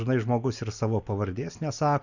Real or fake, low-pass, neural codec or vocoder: real; 7.2 kHz; none